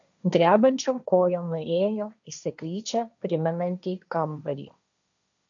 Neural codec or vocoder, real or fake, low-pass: codec, 16 kHz, 1.1 kbps, Voila-Tokenizer; fake; 7.2 kHz